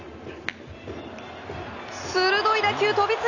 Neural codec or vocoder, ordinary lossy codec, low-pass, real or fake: none; none; 7.2 kHz; real